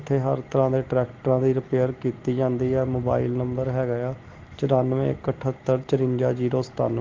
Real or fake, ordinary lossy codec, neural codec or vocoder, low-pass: real; Opus, 16 kbps; none; 7.2 kHz